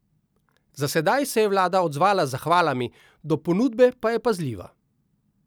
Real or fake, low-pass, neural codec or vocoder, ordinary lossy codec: real; none; none; none